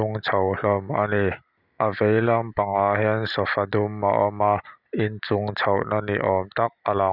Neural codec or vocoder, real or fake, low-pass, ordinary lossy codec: none; real; 5.4 kHz; none